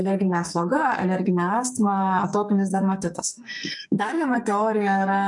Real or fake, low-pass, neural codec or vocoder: fake; 10.8 kHz; codec, 44.1 kHz, 2.6 kbps, SNAC